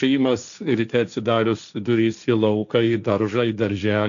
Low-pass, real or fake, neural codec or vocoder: 7.2 kHz; fake; codec, 16 kHz, 1.1 kbps, Voila-Tokenizer